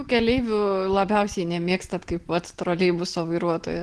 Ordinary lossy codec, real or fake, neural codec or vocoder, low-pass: Opus, 16 kbps; real; none; 10.8 kHz